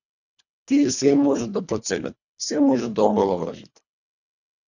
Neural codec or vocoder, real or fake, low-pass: codec, 24 kHz, 1.5 kbps, HILCodec; fake; 7.2 kHz